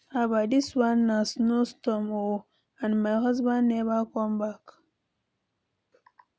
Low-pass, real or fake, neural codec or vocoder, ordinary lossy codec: none; real; none; none